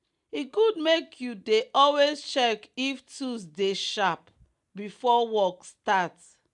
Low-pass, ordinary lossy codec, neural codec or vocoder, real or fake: 10.8 kHz; MP3, 96 kbps; none; real